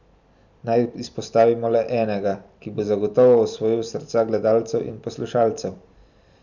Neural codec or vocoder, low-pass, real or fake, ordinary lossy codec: vocoder, 44.1 kHz, 128 mel bands every 256 samples, BigVGAN v2; 7.2 kHz; fake; none